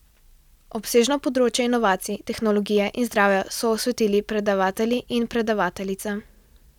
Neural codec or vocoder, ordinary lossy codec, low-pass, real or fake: none; none; 19.8 kHz; real